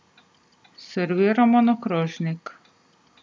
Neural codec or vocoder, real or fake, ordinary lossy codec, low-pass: none; real; none; none